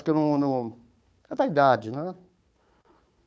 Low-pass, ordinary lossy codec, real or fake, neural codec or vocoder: none; none; fake; codec, 16 kHz, 4 kbps, FunCodec, trained on Chinese and English, 50 frames a second